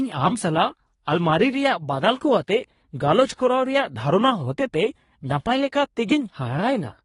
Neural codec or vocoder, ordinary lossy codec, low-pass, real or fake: codec, 24 kHz, 1 kbps, SNAC; AAC, 32 kbps; 10.8 kHz; fake